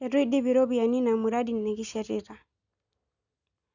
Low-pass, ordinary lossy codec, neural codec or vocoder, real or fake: 7.2 kHz; none; none; real